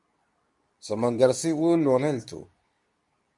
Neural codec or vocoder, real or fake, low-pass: codec, 24 kHz, 0.9 kbps, WavTokenizer, medium speech release version 2; fake; 10.8 kHz